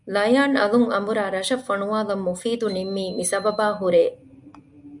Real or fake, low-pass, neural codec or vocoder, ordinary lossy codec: real; 10.8 kHz; none; MP3, 96 kbps